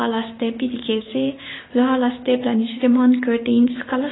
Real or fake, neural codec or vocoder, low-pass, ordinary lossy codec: fake; codec, 16 kHz, 6 kbps, DAC; 7.2 kHz; AAC, 16 kbps